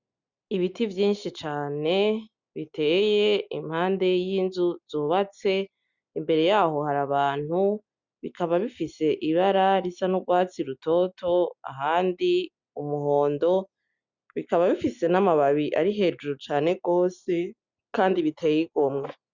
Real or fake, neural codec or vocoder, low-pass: real; none; 7.2 kHz